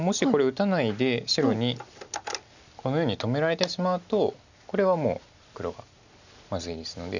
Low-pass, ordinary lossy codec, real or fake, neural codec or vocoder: 7.2 kHz; none; real; none